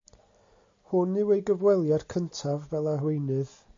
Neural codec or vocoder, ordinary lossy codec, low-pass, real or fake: none; AAC, 48 kbps; 7.2 kHz; real